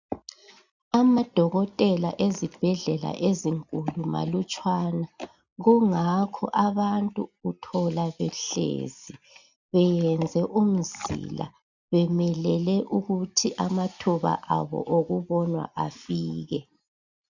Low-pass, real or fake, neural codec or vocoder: 7.2 kHz; real; none